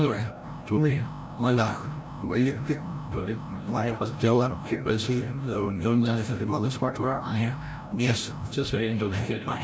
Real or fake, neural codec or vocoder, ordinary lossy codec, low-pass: fake; codec, 16 kHz, 0.5 kbps, FreqCodec, larger model; none; none